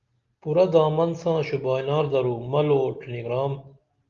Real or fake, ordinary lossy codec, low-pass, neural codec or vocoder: real; Opus, 32 kbps; 7.2 kHz; none